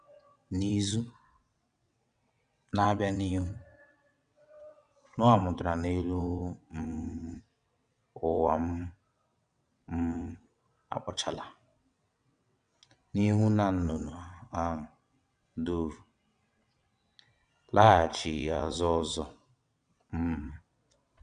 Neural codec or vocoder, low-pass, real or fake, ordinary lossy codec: vocoder, 22.05 kHz, 80 mel bands, WaveNeXt; 9.9 kHz; fake; none